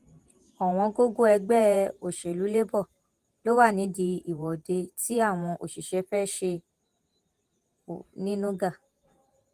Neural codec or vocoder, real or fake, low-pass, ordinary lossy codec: vocoder, 48 kHz, 128 mel bands, Vocos; fake; 14.4 kHz; Opus, 24 kbps